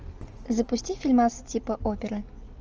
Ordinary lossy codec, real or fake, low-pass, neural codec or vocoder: Opus, 24 kbps; real; 7.2 kHz; none